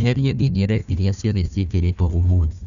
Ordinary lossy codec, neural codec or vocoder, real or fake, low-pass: none; codec, 16 kHz, 1 kbps, FunCodec, trained on Chinese and English, 50 frames a second; fake; 7.2 kHz